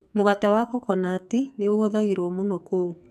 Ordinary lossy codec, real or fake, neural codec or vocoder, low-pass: none; fake; codec, 44.1 kHz, 2.6 kbps, SNAC; 14.4 kHz